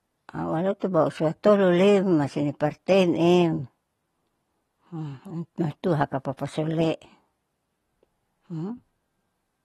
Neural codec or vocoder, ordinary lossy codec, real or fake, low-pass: none; AAC, 32 kbps; real; 19.8 kHz